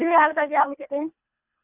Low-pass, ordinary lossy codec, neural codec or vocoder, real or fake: 3.6 kHz; none; codec, 24 kHz, 1.5 kbps, HILCodec; fake